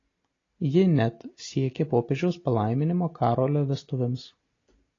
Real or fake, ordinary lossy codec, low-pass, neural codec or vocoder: real; AAC, 32 kbps; 7.2 kHz; none